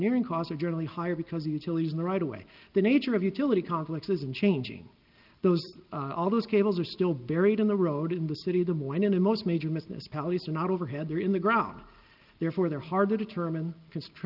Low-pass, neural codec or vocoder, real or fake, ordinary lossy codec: 5.4 kHz; none; real; Opus, 24 kbps